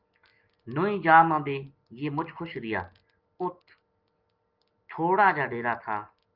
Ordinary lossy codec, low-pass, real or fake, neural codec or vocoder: Opus, 32 kbps; 5.4 kHz; real; none